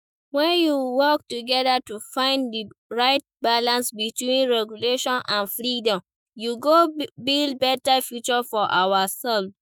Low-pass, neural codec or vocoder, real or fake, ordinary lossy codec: none; autoencoder, 48 kHz, 128 numbers a frame, DAC-VAE, trained on Japanese speech; fake; none